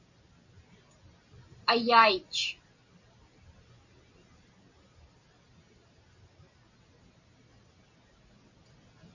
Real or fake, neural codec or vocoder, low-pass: real; none; 7.2 kHz